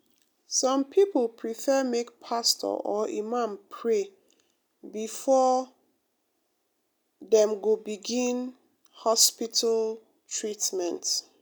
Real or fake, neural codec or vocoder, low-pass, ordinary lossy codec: real; none; none; none